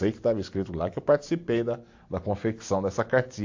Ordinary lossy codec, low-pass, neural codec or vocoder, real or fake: AAC, 48 kbps; 7.2 kHz; none; real